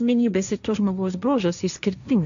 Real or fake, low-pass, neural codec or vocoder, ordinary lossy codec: fake; 7.2 kHz; codec, 16 kHz, 1.1 kbps, Voila-Tokenizer; MP3, 96 kbps